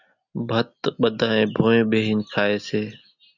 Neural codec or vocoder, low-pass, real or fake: none; 7.2 kHz; real